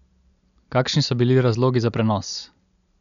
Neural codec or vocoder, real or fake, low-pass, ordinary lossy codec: none; real; 7.2 kHz; none